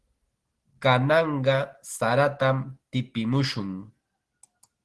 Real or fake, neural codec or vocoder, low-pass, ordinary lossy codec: real; none; 10.8 kHz; Opus, 16 kbps